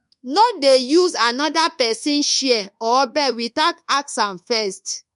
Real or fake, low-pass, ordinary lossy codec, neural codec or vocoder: fake; 10.8 kHz; MP3, 64 kbps; codec, 24 kHz, 1.2 kbps, DualCodec